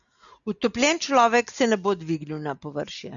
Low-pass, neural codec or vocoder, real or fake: 7.2 kHz; none; real